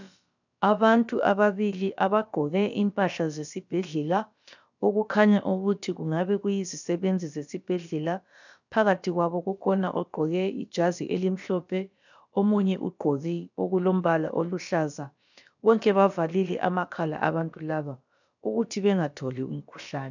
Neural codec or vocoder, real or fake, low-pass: codec, 16 kHz, about 1 kbps, DyCAST, with the encoder's durations; fake; 7.2 kHz